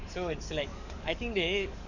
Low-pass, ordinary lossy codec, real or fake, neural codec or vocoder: 7.2 kHz; none; fake; codec, 44.1 kHz, 7.8 kbps, DAC